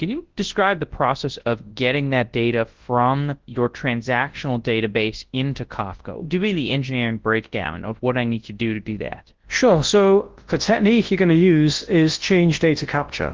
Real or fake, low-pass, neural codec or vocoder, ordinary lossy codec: fake; 7.2 kHz; codec, 24 kHz, 0.9 kbps, WavTokenizer, large speech release; Opus, 16 kbps